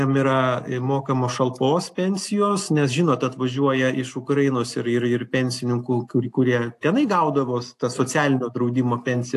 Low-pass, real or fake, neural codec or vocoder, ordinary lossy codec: 14.4 kHz; real; none; AAC, 64 kbps